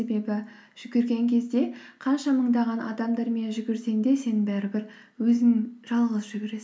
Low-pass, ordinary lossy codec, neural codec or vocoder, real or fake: none; none; none; real